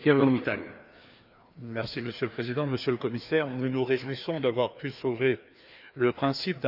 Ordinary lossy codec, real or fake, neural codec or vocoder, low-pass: none; fake; codec, 16 kHz, 2 kbps, FreqCodec, larger model; 5.4 kHz